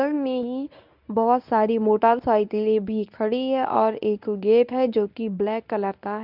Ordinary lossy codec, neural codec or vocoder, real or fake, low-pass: none; codec, 24 kHz, 0.9 kbps, WavTokenizer, medium speech release version 1; fake; 5.4 kHz